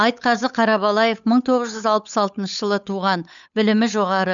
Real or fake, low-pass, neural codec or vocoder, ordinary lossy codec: fake; 7.2 kHz; codec, 16 kHz, 8 kbps, FunCodec, trained on Chinese and English, 25 frames a second; none